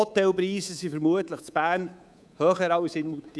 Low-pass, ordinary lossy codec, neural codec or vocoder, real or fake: none; none; codec, 24 kHz, 3.1 kbps, DualCodec; fake